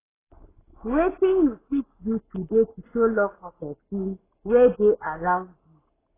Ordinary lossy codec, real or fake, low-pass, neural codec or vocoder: AAC, 16 kbps; fake; 3.6 kHz; codec, 44.1 kHz, 7.8 kbps, Pupu-Codec